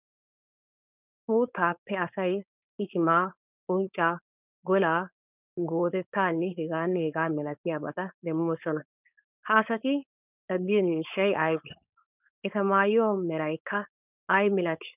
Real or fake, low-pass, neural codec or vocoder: fake; 3.6 kHz; codec, 16 kHz, 4.8 kbps, FACodec